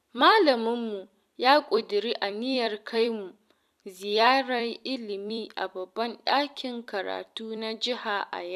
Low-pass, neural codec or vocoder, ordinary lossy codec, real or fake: 14.4 kHz; vocoder, 44.1 kHz, 128 mel bands every 256 samples, BigVGAN v2; none; fake